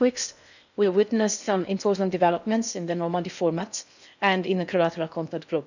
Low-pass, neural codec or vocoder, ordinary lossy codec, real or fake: 7.2 kHz; codec, 16 kHz in and 24 kHz out, 0.8 kbps, FocalCodec, streaming, 65536 codes; none; fake